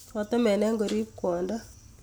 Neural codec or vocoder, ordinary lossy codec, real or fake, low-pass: none; none; real; none